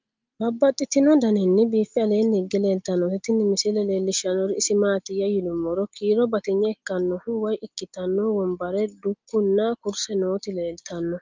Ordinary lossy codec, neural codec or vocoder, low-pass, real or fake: Opus, 32 kbps; none; 7.2 kHz; real